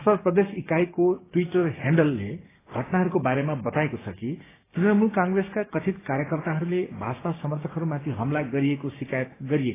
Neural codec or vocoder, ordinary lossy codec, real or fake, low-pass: codec, 16 kHz, 6 kbps, DAC; AAC, 16 kbps; fake; 3.6 kHz